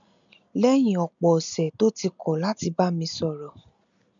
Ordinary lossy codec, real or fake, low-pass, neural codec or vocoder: none; real; 7.2 kHz; none